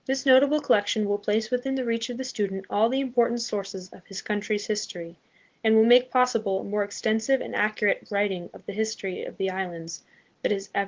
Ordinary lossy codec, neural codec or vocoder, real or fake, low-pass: Opus, 16 kbps; none; real; 7.2 kHz